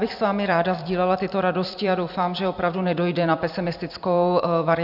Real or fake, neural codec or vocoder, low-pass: fake; vocoder, 44.1 kHz, 128 mel bands every 256 samples, BigVGAN v2; 5.4 kHz